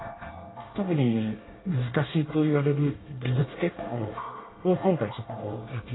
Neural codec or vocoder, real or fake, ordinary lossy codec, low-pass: codec, 24 kHz, 1 kbps, SNAC; fake; AAC, 16 kbps; 7.2 kHz